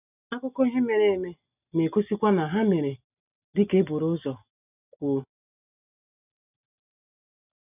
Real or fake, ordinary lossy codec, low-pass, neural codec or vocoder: real; none; 3.6 kHz; none